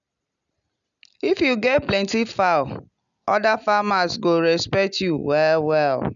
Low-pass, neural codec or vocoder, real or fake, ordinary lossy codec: 7.2 kHz; none; real; none